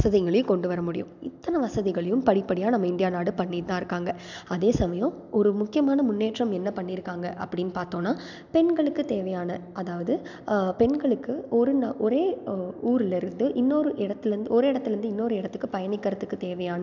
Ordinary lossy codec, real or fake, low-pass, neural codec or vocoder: none; real; 7.2 kHz; none